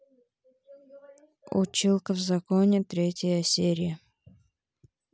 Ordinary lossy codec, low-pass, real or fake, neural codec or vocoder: none; none; real; none